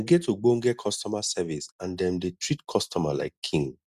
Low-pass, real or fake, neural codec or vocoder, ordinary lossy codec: 14.4 kHz; real; none; Opus, 64 kbps